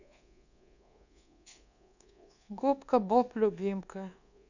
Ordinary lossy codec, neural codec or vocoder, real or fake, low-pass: none; codec, 24 kHz, 1.2 kbps, DualCodec; fake; 7.2 kHz